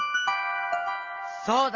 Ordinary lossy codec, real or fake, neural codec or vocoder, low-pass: Opus, 24 kbps; real; none; 7.2 kHz